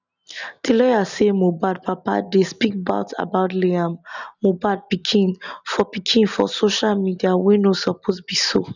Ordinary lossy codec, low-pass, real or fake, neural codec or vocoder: none; 7.2 kHz; real; none